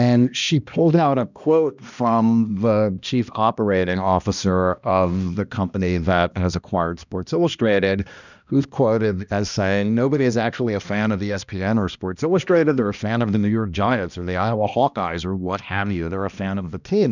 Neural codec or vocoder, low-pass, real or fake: codec, 16 kHz, 1 kbps, X-Codec, HuBERT features, trained on balanced general audio; 7.2 kHz; fake